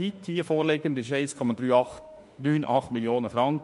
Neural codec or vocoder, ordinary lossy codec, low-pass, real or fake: autoencoder, 48 kHz, 32 numbers a frame, DAC-VAE, trained on Japanese speech; MP3, 48 kbps; 14.4 kHz; fake